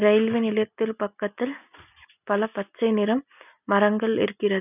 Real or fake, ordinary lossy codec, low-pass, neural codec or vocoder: real; none; 3.6 kHz; none